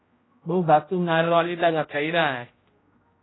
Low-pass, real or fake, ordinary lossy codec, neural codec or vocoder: 7.2 kHz; fake; AAC, 16 kbps; codec, 16 kHz, 0.5 kbps, X-Codec, HuBERT features, trained on general audio